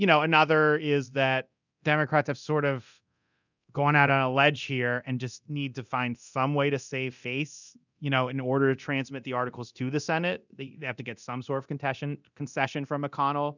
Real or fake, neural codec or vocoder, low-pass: fake; codec, 24 kHz, 0.9 kbps, DualCodec; 7.2 kHz